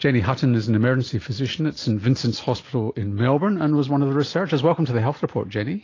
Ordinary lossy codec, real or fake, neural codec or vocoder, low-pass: AAC, 32 kbps; real; none; 7.2 kHz